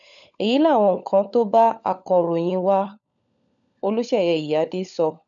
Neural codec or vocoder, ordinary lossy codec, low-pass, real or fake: codec, 16 kHz, 16 kbps, FunCodec, trained on LibriTTS, 50 frames a second; none; 7.2 kHz; fake